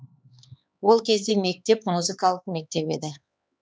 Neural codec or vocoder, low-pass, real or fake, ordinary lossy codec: codec, 16 kHz, 4 kbps, X-Codec, HuBERT features, trained on LibriSpeech; none; fake; none